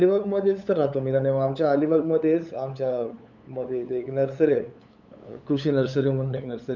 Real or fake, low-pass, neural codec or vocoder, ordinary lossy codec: fake; 7.2 kHz; codec, 16 kHz, 8 kbps, FunCodec, trained on LibriTTS, 25 frames a second; none